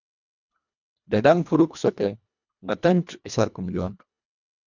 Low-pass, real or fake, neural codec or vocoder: 7.2 kHz; fake; codec, 24 kHz, 1.5 kbps, HILCodec